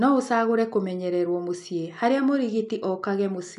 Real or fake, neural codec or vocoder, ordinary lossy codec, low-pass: real; none; none; 10.8 kHz